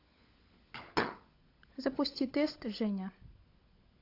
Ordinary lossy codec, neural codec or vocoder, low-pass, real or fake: AAC, 32 kbps; codec, 16 kHz, 16 kbps, FunCodec, trained on LibriTTS, 50 frames a second; 5.4 kHz; fake